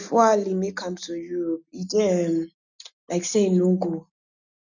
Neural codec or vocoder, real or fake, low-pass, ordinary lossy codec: none; real; 7.2 kHz; none